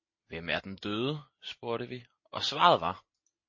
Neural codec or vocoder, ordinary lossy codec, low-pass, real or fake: none; MP3, 32 kbps; 7.2 kHz; real